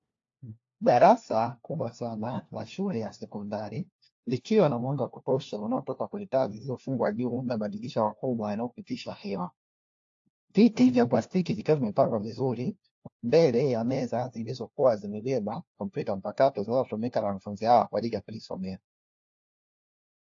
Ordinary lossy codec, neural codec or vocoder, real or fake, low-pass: AAC, 48 kbps; codec, 16 kHz, 1 kbps, FunCodec, trained on LibriTTS, 50 frames a second; fake; 7.2 kHz